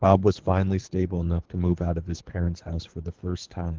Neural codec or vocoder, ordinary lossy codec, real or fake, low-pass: codec, 24 kHz, 3 kbps, HILCodec; Opus, 16 kbps; fake; 7.2 kHz